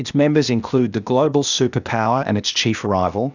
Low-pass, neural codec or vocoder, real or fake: 7.2 kHz; codec, 16 kHz, 0.8 kbps, ZipCodec; fake